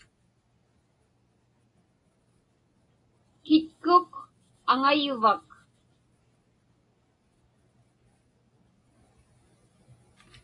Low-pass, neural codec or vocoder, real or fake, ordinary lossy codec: 10.8 kHz; none; real; AAC, 32 kbps